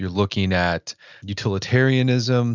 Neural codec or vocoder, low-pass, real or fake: none; 7.2 kHz; real